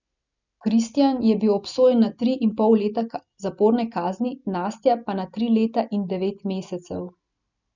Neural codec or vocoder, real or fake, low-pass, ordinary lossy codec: none; real; 7.2 kHz; none